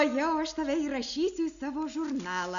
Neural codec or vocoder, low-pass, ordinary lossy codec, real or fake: none; 7.2 kHz; MP3, 96 kbps; real